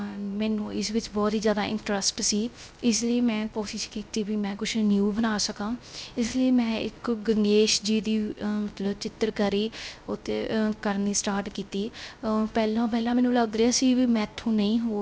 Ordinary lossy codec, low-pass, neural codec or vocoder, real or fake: none; none; codec, 16 kHz, 0.3 kbps, FocalCodec; fake